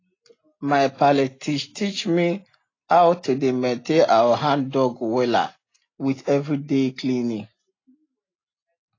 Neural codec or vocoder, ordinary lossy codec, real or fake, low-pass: none; AAC, 32 kbps; real; 7.2 kHz